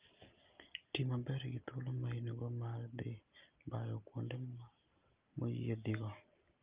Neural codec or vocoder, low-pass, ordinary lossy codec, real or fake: none; 3.6 kHz; Opus, 32 kbps; real